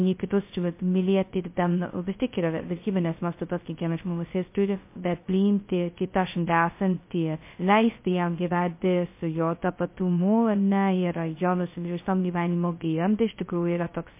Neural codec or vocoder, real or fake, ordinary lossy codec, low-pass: codec, 16 kHz, 0.2 kbps, FocalCodec; fake; MP3, 24 kbps; 3.6 kHz